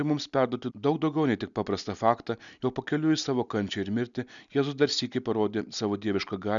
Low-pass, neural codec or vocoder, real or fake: 7.2 kHz; none; real